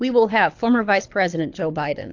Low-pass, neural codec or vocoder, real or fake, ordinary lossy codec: 7.2 kHz; codec, 24 kHz, 6 kbps, HILCodec; fake; AAC, 48 kbps